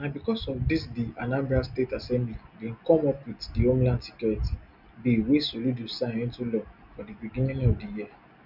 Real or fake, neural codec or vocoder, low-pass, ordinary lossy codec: real; none; 5.4 kHz; none